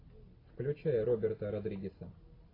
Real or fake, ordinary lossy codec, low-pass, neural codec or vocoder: real; AAC, 24 kbps; 5.4 kHz; none